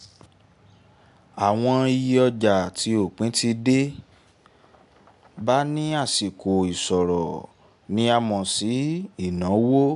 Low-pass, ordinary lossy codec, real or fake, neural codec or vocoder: 10.8 kHz; AAC, 96 kbps; real; none